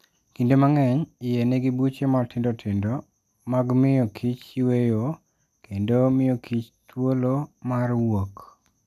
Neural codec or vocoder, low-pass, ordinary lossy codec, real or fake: none; 14.4 kHz; none; real